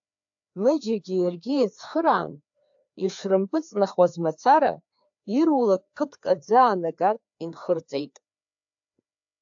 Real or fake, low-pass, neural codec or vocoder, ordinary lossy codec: fake; 7.2 kHz; codec, 16 kHz, 2 kbps, FreqCodec, larger model; AAC, 64 kbps